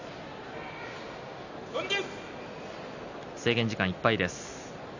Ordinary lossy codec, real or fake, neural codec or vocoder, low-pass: none; real; none; 7.2 kHz